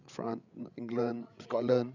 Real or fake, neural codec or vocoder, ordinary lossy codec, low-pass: fake; codec, 16 kHz, 8 kbps, FreqCodec, larger model; none; 7.2 kHz